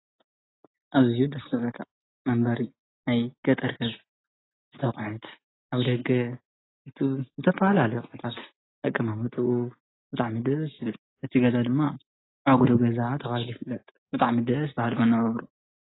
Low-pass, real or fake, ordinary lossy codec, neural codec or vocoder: 7.2 kHz; real; AAC, 16 kbps; none